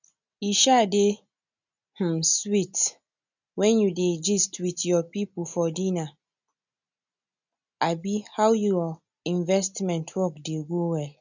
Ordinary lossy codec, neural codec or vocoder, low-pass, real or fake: none; none; 7.2 kHz; real